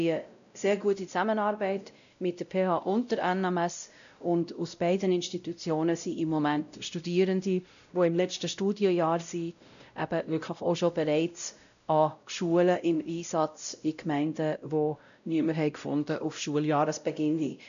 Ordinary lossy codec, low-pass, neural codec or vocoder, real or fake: none; 7.2 kHz; codec, 16 kHz, 0.5 kbps, X-Codec, WavLM features, trained on Multilingual LibriSpeech; fake